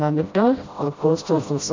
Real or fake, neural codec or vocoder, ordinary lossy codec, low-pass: fake; codec, 16 kHz, 0.5 kbps, FreqCodec, smaller model; MP3, 64 kbps; 7.2 kHz